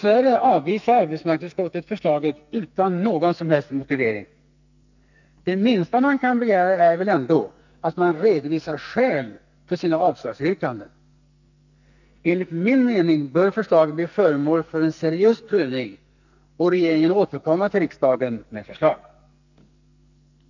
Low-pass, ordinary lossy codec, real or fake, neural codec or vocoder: 7.2 kHz; none; fake; codec, 44.1 kHz, 2.6 kbps, SNAC